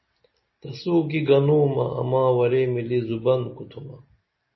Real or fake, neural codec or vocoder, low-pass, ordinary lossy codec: real; none; 7.2 kHz; MP3, 24 kbps